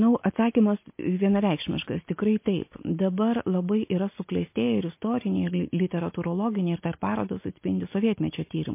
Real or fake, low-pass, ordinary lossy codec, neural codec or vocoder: real; 3.6 kHz; MP3, 24 kbps; none